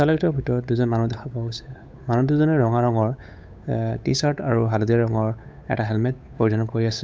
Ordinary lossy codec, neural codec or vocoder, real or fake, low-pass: none; codec, 16 kHz, 8 kbps, FunCodec, trained on Chinese and English, 25 frames a second; fake; none